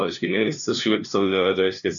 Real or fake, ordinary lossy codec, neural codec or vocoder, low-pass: fake; MP3, 96 kbps; codec, 16 kHz, 0.5 kbps, FunCodec, trained on LibriTTS, 25 frames a second; 7.2 kHz